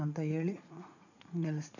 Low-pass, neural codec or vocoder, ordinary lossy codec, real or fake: 7.2 kHz; vocoder, 44.1 kHz, 80 mel bands, Vocos; none; fake